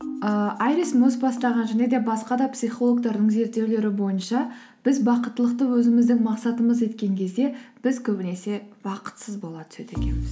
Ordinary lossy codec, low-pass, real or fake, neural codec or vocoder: none; none; real; none